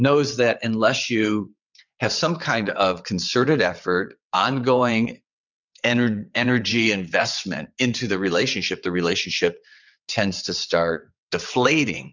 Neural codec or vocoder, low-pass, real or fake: vocoder, 22.05 kHz, 80 mel bands, WaveNeXt; 7.2 kHz; fake